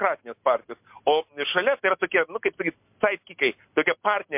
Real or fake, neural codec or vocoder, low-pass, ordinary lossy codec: real; none; 3.6 kHz; MP3, 32 kbps